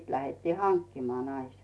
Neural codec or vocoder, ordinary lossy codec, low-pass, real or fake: none; none; none; real